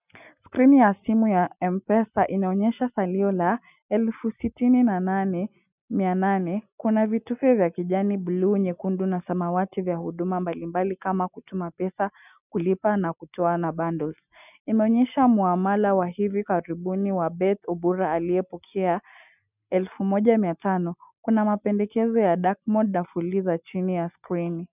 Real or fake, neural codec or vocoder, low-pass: real; none; 3.6 kHz